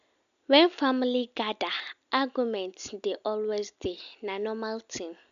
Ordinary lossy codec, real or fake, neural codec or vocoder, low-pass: none; real; none; 7.2 kHz